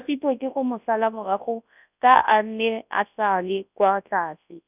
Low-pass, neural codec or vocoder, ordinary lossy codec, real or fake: 3.6 kHz; codec, 24 kHz, 0.9 kbps, WavTokenizer, large speech release; none; fake